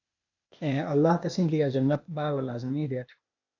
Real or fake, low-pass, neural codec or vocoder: fake; 7.2 kHz; codec, 16 kHz, 0.8 kbps, ZipCodec